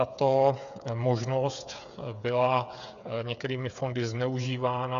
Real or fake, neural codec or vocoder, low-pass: fake; codec, 16 kHz, 8 kbps, FreqCodec, smaller model; 7.2 kHz